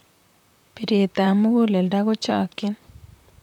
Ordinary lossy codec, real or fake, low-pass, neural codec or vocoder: none; fake; 19.8 kHz; vocoder, 44.1 kHz, 128 mel bands, Pupu-Vocoder